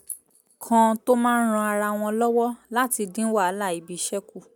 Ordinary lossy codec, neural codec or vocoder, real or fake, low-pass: none; none; real; none